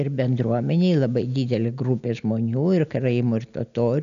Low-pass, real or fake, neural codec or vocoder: 7.2 kHz; real; none